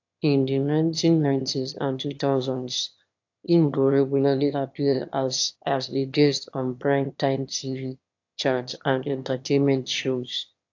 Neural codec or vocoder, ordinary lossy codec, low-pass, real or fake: autoencoder, 22.05 kHz, a latent of 192 numbers a frame, VITS, trained on one speaker; AAC, 48 kbps; 7.2 kHz; fake